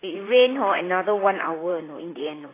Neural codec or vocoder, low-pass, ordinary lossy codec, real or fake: vocoder, 44.1 kHz, 128 mel bands, Pupu-Vocoder; 3.6 kHz; AAC, 16 kbps; fake